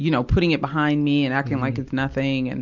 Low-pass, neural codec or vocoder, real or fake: 7.2 kHz; none; real